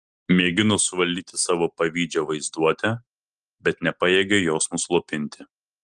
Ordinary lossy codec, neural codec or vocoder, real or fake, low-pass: Opus, 24 kbps; none; real; 9.9 kHz